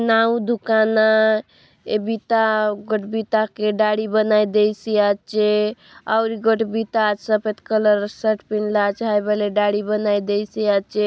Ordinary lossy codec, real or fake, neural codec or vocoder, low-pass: none; real; none; none